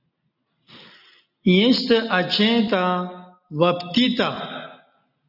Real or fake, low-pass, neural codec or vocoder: real; 5.4 kHz; none